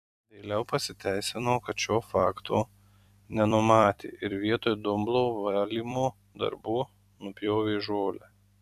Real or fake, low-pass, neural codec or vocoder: fake; 14.4 kHz; vocoder, 44.1 kHz, 128 mel bands every 256 samples, BigVGAN v2